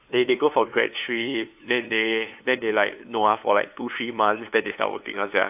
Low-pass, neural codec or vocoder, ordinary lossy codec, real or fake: 3.6 kHz; codec, 16 kHz, 2 kbps, FunCodec, trained on LibriTTS, 25 frames a second; AAC, 32 kbps; fake